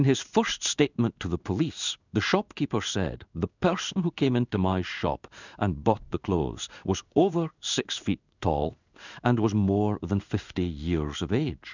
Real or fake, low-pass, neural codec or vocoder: fake; 7.2 kHz; codec, 16 kHz in and 24 kHz out, 1 kbps, XY-Tokenizer